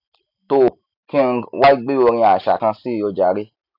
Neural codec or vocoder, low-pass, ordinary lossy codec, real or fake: none; 5.4 kHz; none; real